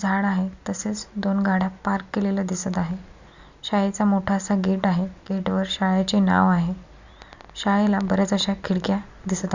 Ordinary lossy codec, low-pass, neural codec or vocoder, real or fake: Opus, 64 kbps; 7.2 kHz; none; real